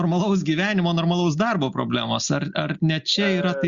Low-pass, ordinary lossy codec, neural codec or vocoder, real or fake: 7.2 kHz; Opus, 64 kbps; none; real